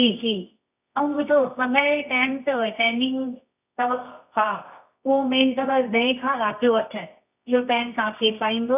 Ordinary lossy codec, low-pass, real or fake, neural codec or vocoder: none; 3.6 kHz; fake; codec, 24 kHz, 0.9 kbps, WavTokenizer, medium music audio release